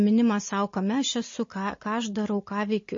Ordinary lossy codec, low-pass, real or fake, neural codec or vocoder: MP3, 32 kbps; 7.2 kHz; real; none